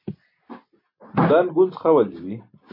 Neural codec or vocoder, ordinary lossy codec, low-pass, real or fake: none; MP3, 32 kbps; 5.4 kHz; real